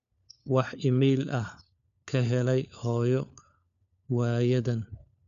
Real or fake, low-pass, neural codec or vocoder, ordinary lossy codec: fake; 7.2 kHz; codec, 16 kHz, 4 kbps, FunCodec, trained on LibriTTS, 50 frames a second; none